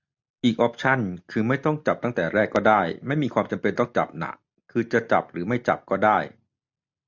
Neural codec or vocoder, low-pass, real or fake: none; 7.2 kHz; real